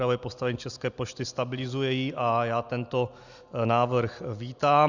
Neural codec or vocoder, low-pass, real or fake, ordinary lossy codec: none; 7.2 kHz; real; Opus, 64 kbps